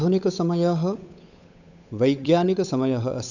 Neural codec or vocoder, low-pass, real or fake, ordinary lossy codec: codec, 16 kHz, 8 kbps, FunCodec, trained on Chinese and English, 25 frames a second; 7.2 kHz; fake; none